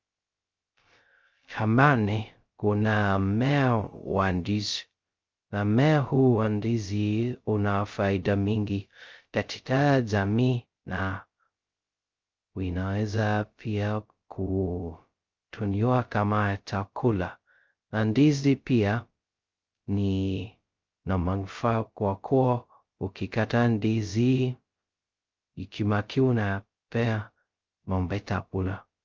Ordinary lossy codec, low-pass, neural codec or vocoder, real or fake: Opus, 24 kbps; 7.2 kHz; codec, 16 kHz, 0.2 kbps, FocalCodec; fake